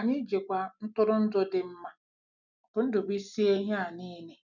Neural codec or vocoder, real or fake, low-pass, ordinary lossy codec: none; real; 7.2 kHz; none